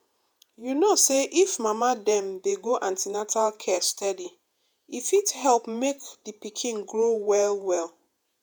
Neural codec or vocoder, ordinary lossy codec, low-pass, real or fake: vocoder, 48 kHz, 128 mel bands, Vocos; none; none; fake